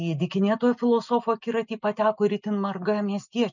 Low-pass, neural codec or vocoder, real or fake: 7.2 kHz; none; real